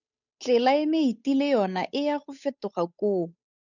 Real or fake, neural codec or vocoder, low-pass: fake; codec, 16 kHz, 8 kbps, FunCodec, trained on Chinese and English, 25 frames a second; 7.2 kHz